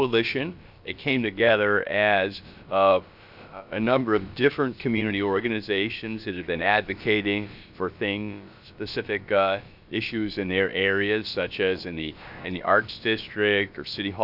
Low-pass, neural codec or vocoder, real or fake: 5.4 kHz; codec, 16 kHz, about 1 kbps, DyCAST, with the encoder's durations; fake